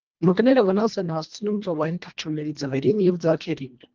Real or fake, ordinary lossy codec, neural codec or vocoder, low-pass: fake; Opus, 32 kbps; codec, 24 kHz, 1.5 kbps, HILCodec; 7.2 kHz